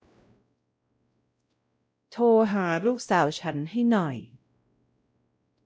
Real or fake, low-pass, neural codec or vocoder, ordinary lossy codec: fake; none; codec, 16 kHz, 0.5 kbps, X-Codec, WavLM features, trained on Multilingual LibriSpeech; none